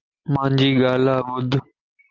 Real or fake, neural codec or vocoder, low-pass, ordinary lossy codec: real; none; 7.2 kHz; Opus, 32 kbps